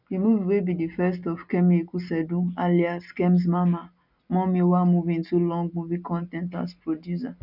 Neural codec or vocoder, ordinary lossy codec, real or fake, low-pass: none; none; real; 5.4 kHz